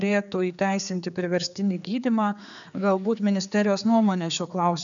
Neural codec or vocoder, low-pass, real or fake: codec, 16 kHz, 4 kbps, X-Codec, HuBERT features, trained on general audio; 7.2 kHz; fake